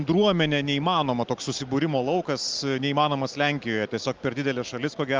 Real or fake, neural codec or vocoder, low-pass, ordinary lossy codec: real; none; 7.2 kHz; Opus, 32 kbps